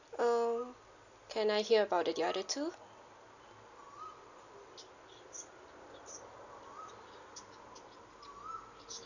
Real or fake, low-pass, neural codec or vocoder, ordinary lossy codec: real; 7.2 kHz; none; none